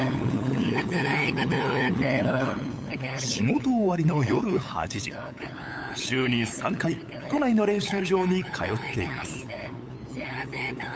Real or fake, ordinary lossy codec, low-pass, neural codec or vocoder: fake; none; none; codec, 16 kHz, 8 kbps, FunCodec, trained on LibriTTS, 25 frames a second